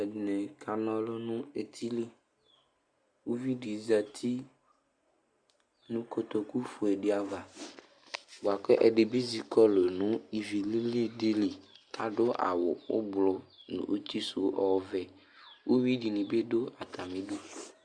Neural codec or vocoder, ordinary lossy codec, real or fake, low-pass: none; Opus, 64 kbps; real; 9.9 kHz